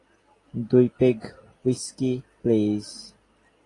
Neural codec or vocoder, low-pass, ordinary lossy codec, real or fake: none; 10.8 kHz; AAC, 32 kbps; real